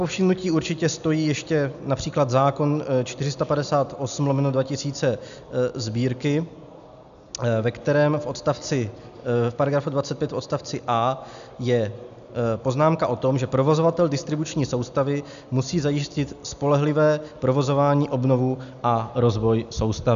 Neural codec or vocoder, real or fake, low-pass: none; real; 7.2 kHz